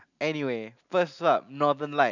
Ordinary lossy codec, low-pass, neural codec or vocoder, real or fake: none; 7.2 kHz; none; real